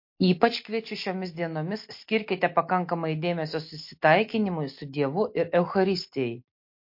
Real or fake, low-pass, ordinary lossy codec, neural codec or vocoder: real; 5.4 kHz; MP3, 32 kbps; none